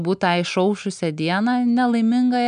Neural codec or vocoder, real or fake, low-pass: none; real; 9.9 kHz